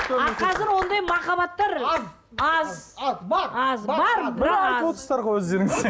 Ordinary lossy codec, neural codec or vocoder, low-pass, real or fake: none; none; none; real